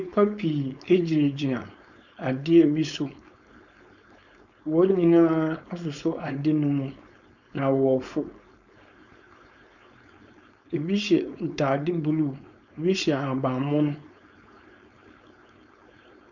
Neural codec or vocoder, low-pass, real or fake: codec, 16 kHz, 4.8 kbps, FACodec; 7.2 kHz; fake